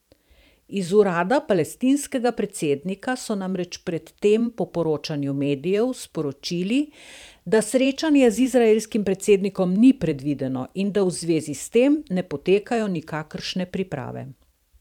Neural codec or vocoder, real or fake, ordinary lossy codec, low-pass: vocoder, 44.1 kHz, 128 mel bands every 512 samples, BigVGAN v2; fake; none; 19.8 kHz